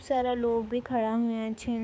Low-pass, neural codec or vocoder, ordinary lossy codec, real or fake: none; codec, 16 kHz, 4 kbps, X-Codec, HuBERT features, trained on balanced general audio; none; fake